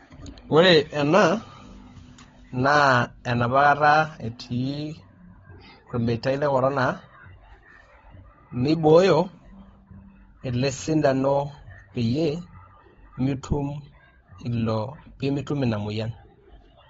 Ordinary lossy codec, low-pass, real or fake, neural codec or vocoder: AAC, 24 kbps; 7.2 kHz; fake; codec, 16 kHz, 16 kbps, FunCodec, trained on LibriTTS, 50 frames a second